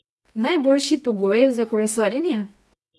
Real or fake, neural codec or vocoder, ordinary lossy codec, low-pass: fake; codec, 24 kHz, 0.9 kbps, WavTokenizer, medium music audio release; none; none